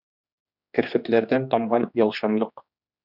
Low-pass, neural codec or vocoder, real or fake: 5.4 kHz; codec, 16 kHz, 2 kbps, X-Codec, HuBERT features, trained on general audio; fake